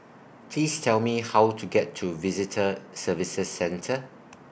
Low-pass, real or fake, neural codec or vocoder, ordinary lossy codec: none; real; none; none